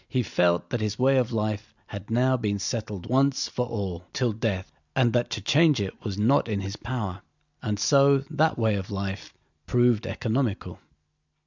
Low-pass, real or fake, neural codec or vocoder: 7.2 kHz; real; none